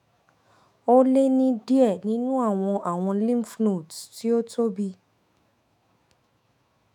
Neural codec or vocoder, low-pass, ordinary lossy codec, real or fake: autoencoder, 48 kHz, 128 numbers a frame, DAC-VAE, trained on Japanese speech; 19.8 kHz; none; fake